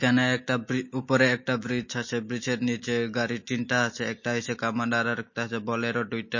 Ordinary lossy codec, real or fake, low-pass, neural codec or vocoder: MP3, 32 kbps; real; 7.2 kHz; none